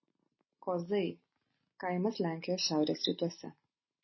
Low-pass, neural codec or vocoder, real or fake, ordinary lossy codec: 7.2 kHz; none; real; MP3, 24 kbps